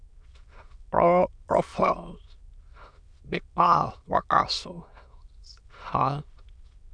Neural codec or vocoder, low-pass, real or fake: autoencoder, 22.05 kHz, a latent of 192 numbers a frame, VITS, trained on many speakers; 9.9 kHz; fake